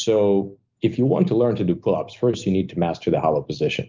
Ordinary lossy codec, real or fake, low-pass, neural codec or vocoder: Opus, 24 kbps; real; 7.2 kHz; none